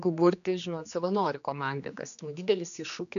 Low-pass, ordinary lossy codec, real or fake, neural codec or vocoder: 7.2 kHz; AAC, 96 kbps; fake; codec, 16 kHz, 2 kbps, X-Codec, HuBERT features, trained on general audio